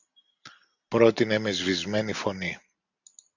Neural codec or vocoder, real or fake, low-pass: none; real; 7.2 kHz